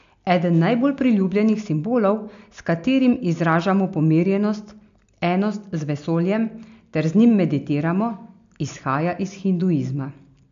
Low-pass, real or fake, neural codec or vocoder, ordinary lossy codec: 7.2 kHz; real; none; AAC, 64 kbps